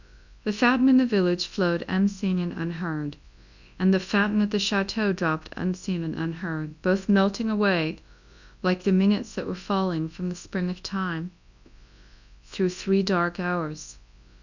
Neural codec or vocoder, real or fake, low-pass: codec, 24 kHz, 0.9 kbps, WavTokenizer, large speech release; fake; 7.2 kHz